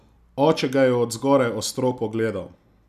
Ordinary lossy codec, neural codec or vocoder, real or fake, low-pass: none; none; real; 14.4 kHz